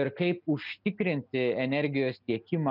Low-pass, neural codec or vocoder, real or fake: 5.4 kHz; none; real